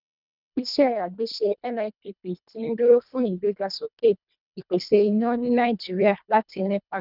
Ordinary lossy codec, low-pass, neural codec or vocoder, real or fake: none; 5.4 kHz; codec, 24 kHz, 1.5 kbps, HILCodec; fake